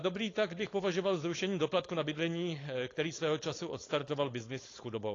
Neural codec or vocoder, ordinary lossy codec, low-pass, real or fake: codec, 16 kHz, 4.8 kbps, FACodec; AAC, 32 kbps; 7.2 kHz; fake